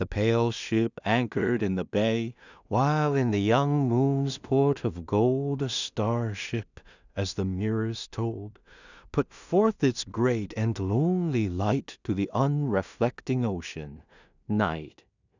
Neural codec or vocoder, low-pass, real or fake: codec, 16 kHz in and 24 kHz out, 0.4 kbps, LongCat-Audio-Codec, two codebook decoder; 7.2 kHz; fake